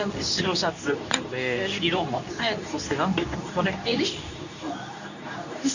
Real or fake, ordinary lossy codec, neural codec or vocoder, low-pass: fake; none; codec, 24 kHz, 0.9 kbps, WavTokenizer, medium speech release version 2; 7.2 kHz